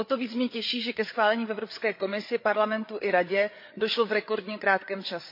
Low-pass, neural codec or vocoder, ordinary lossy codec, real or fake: 5.4 kHz; codec, 16 kHz, 8 kbps, FreqCodec, larger model; MP3, 32 kbps; fake